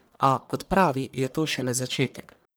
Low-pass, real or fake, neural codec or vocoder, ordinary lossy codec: none; fake; codec, 44.1 kHz, 1.7 kbps, Pupu-Codec; none